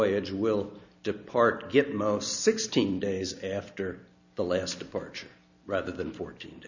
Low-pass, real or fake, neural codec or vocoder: 7.2 kHz; real; none